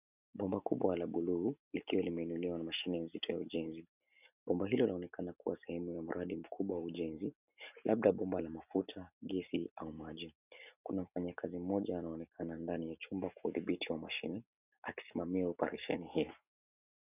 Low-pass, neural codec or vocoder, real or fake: 3.6 kHz; none; real